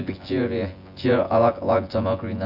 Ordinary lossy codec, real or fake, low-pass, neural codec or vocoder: AAC, 48 kbps; fake; 5.4 kHz; vocoder, 24 kHz, 100 mel bands, Vocos